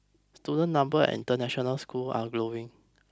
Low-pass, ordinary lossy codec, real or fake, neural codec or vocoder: none; none; real; none